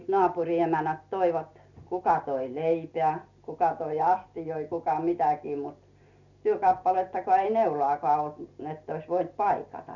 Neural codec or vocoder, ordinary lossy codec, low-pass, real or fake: none; none; 7.2 kHz; real